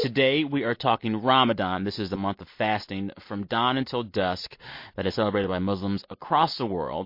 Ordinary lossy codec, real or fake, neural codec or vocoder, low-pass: MP3, 32 kbps; real; none; 5.4 kHz